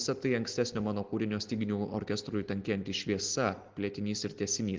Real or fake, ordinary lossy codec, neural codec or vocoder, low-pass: real; Opus, 16 kbps; none; 7.2 kHz